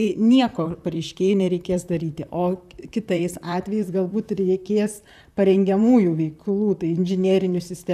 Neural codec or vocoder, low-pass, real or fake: vocoder, 44.1 kHz, 128 mel bands, Pupu-Vocoder; 14.4 kHz; fake